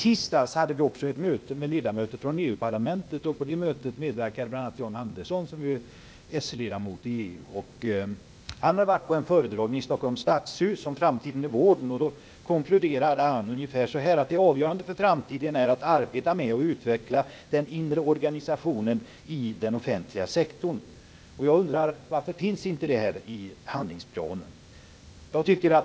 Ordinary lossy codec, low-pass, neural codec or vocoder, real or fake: none; none; codec, 16 kHz, 0.8 kbps, ZipCodec; fake